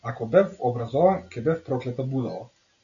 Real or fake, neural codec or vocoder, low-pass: real; none; 7.2 kHz